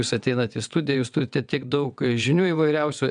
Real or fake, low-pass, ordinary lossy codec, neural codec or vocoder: fake; 9.9 kHz; MP3, 96 kbps; vocoder, 22.05 kHz, 80 mel bands, WaveNeXt